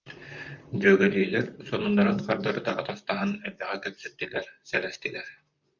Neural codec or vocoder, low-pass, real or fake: vocoder, 44.1 kHz, 128 mel bands, Pupu-Vocoder; 7.2 kHz; fake